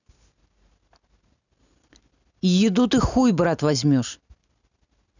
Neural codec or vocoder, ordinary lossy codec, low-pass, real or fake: none; none; 7.2 kHz; real